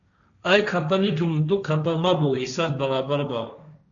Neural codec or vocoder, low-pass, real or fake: codec, 16 kHz, 1.1 kbps, Voila-Tokenizer; 7.2 kHz; fake